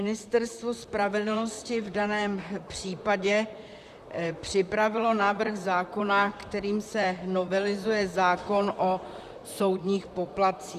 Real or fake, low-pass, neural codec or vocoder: fake; 14.4 kHz; vocoder, 44.1 kHz, 128 mel bands, Pupu-Vocoder